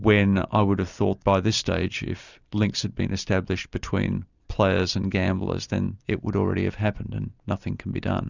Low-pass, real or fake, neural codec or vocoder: 7.2 kHz; real; none